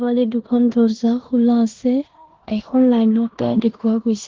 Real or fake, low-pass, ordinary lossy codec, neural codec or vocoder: fake; 7.2 kHz; Opus, 16 kbps; codec, 16 kHz in and 24 kHz out, 0.9 kbps, LongCat-Audio-Codec, four codebook decoder